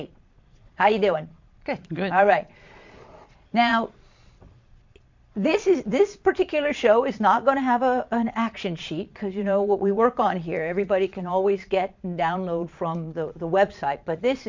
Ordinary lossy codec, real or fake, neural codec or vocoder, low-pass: MP3, 64 kbps; fake; vocoder, 22.05 kHz, 80 mel bands, Vocos; 7.2 kHz